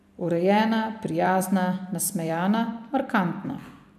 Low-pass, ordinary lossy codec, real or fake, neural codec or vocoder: 14.4 kHz; none; fake; vocoder, 44.1 kHz, 128 mel bands every 256 samples, BigVGAN v2